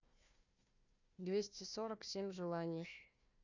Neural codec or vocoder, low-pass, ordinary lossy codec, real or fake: codec, 16 kHz, 1 kbps, FunCodec, trained on Chinese and English, 50 frames a second; 7.2 kHz; none; fake